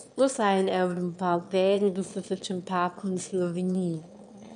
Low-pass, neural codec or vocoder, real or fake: 9.9 kHz; autoencoder, 22.05 kHz, a latent of 192 numbers a frame, VITS, trained on one speaker; fake